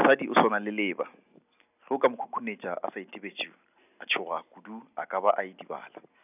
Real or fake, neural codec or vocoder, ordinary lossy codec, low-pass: real; none; none; 3.6 kHz